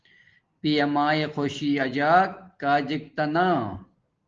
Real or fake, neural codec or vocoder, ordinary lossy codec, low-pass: real; none; Opus, 16 kbps; 7.2 kHz